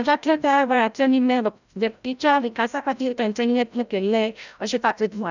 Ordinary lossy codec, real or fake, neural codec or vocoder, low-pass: none; fake; codec, 16 kHz, 0.5 kbps, FreqCodec, larger model; 7.2 kHz